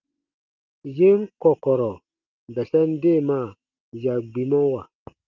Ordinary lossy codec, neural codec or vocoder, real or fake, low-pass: Opus, 32 kbps; none; real; 7.2 kHz